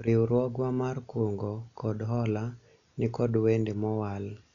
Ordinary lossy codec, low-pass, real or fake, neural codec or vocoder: none; 7.2 kHz; real; none